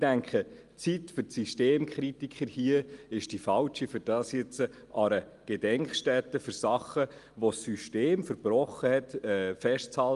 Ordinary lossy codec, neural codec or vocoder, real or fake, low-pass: Opus, 32 kbps; none; real; 10.8 kHz